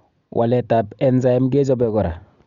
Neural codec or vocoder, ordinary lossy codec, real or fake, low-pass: none; Opus, 64 kbps; real; 7.2 kHz